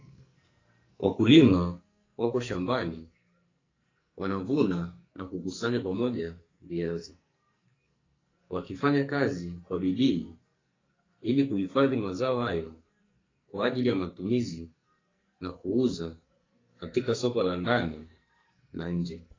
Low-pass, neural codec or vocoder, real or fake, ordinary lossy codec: 7.2 kHz; codec, 44.1 kHz, 2.6 kbps, SNAC; fake; AAC, 32 kbps